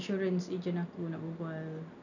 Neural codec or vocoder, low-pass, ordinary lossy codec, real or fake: none; 7.2 kHz; none; real